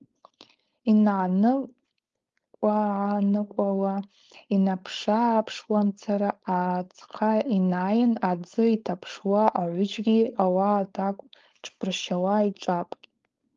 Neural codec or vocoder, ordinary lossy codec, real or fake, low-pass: codec, 16 kHz, 4.8 kbps, FACodec; Opus, 32 kbps; fake; 7.2 kHz